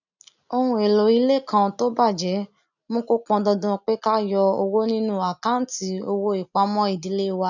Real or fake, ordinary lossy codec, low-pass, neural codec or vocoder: real; none; 7.2 kHz; none